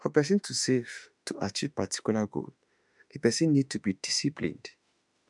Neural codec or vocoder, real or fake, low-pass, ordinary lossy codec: autoencoder, 48 kHz, 32 numbers a frame, DAC-VAE, trained on Japanese speech; fake; 10.8 kHz; none